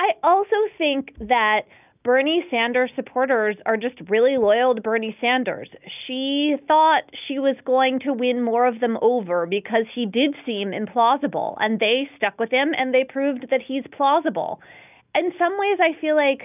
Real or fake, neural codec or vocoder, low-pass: real; none; 3.6 kHz